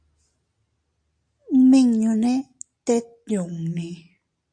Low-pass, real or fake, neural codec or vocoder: 9.9 kHz; real; none